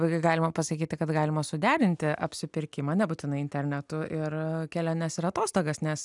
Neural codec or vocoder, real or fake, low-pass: none; real; 10.8 kHz